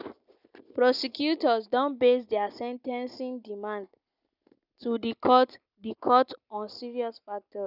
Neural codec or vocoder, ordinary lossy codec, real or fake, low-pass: none; none; real; 5.4 kHz